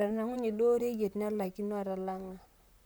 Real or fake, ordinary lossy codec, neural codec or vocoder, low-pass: fake; none; vocoder, 44.1 kHz, 128 mel bands, Pupu-Vocoder; none